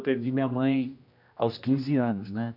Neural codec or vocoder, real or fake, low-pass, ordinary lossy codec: codec, 16 kHz, 1 kbps, X-Codec, HuBERT features, trained on general audio; fake; 5.4 kHz; none